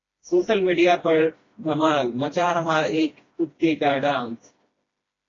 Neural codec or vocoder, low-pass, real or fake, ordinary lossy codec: codec, 16 kHz, 1 kbps, FreqCodec, smaller model; 7.2 kHz; fake; AAC, 32 kbps